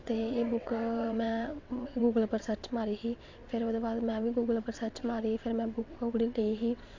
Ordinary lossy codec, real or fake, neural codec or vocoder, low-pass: MP3, 48 kbps; fake; vocoder, 22.05 kHz, 80 mel bands, WaveNeXt; 7.2 kHz